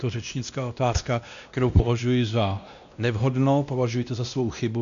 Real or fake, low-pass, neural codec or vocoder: fake; 7.2 kHz; codec, 16 kHz, 1 kbps, X-Codec, WavLM features, trained on Multilingual LibriSpeech